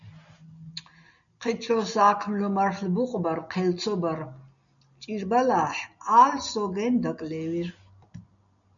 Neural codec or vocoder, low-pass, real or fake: none; 7.2 kHz; real